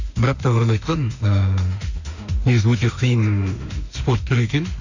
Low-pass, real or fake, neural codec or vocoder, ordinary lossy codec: 7.2 kHz; fake; autoencoder, 48 kHz, 32 numbers a frame, DAC-VAE, trained on Japanese speech; none